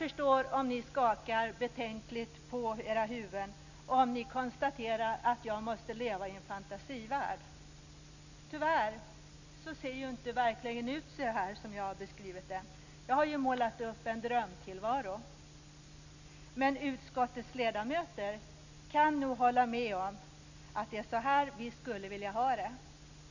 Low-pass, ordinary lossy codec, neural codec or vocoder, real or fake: 7.2 kHz; none; none; real